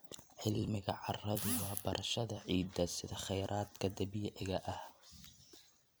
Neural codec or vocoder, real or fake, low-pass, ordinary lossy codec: vocoder, 44.1 kHz, 128 mel bands every 256 samples, BigVGAN v2; fake; none; none